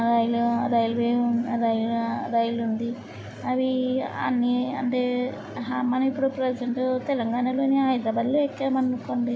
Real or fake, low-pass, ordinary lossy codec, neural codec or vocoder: real; none; none; none